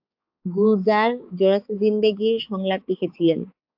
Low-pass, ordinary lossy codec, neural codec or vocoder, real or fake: 5.4 kHz; AAC, 48 kbps; codec, 16 kHz, 4 kbps, X-Codec, HuBERT features, trained on balanced general audio; fake